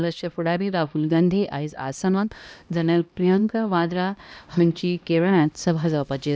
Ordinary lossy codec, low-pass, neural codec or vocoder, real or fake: none; none; codec, 16 kHz, 1 kbps, X-Codec, HuBERT features, trained on LibriSpeech; fake